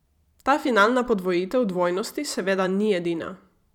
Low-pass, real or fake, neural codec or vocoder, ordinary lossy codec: 19.8 kHz; fake; vocoder, 44.1 kHz, 128 mel bands every 512 samples, BigVGAN v2; none